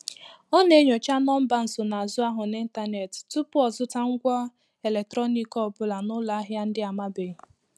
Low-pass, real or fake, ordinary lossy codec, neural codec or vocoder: none; real; none; none